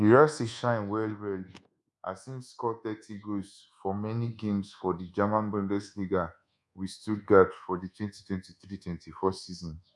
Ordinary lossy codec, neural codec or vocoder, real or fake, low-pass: none; codec, 24 kHz, 1.2 kbps, DualCodec; fake; none